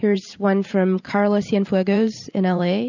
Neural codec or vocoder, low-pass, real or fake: vocoder, 44.1 kHz, 128 mel bands every 512 samples, BigVGAN v2; 7.2 kHz; fake